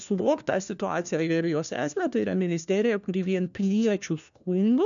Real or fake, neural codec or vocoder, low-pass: fake; codec, 16 kHz, 1 kbps, FunCodec, trained on LibriTTS, 50 frames a second; 7.2 kHz